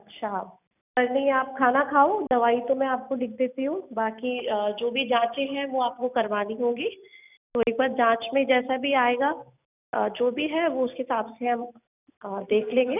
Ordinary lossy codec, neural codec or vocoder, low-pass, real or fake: none; none; 3.6 kHz; real